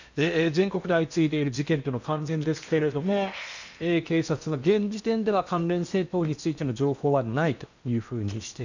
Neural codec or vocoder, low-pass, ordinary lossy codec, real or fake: codec, 16 kHz in and 24 kHz out, 0.8 kbps, FocalCodec, streaming, 65536 codes; 7.2 kHz; none; fake